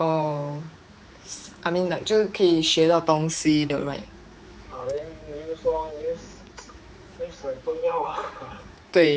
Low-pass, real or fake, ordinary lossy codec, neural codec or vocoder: none; fake; none; codec, 16 kHz, 4 kbps, X-Codec, HuBERT features, trained on general audio